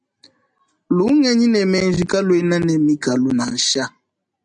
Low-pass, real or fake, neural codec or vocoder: 10.8 kHz; real; none